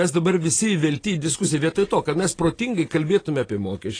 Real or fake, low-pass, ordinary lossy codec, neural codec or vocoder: real; 9.9 kHz; AAC, 32 kbps; none